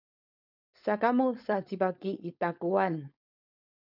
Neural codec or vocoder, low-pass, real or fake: codec, 16 kHz, 4.8 kbps, FACodec; 5.4 kHz; fake